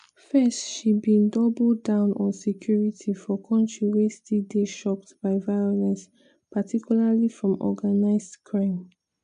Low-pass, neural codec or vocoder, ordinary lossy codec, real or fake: 9.9 kHz; none; AAC, 96 kbps; real